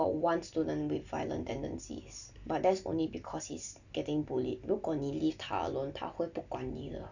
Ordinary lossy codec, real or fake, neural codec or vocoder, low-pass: none; real; none; 7.2 kHz